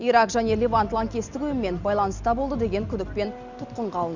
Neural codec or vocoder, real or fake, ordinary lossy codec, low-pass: none; real; none; 7.2 kHz